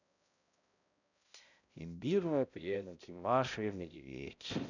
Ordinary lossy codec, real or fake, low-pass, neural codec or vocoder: none; fake; 7.2 kHz; codec, 16 kHz, 0.5 kbps, X-Codec, HuBERT features, trained on balanced general audio